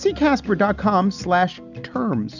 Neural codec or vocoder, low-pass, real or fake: none; 7.2 kHz; real